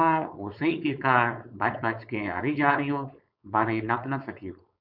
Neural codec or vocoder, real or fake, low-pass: codec, 16 kHz, 4.8 kbps, FACodec; fake; 5.4 kHz